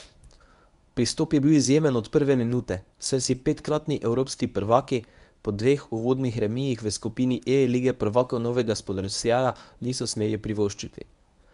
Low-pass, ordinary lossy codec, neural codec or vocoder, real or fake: 10.8 kHz; none; codec, 24 kHz, 0.9 kbps, WavTokenizer, medium speech release version 1; fake